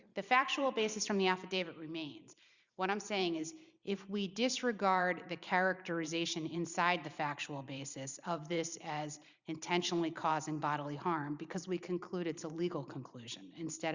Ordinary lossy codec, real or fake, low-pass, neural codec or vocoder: Opus, 64 kbps; fake; 7.2 kHz; vocoder, 44.1 kHz, 128 mel bands every 512 samples, BigVGAN v2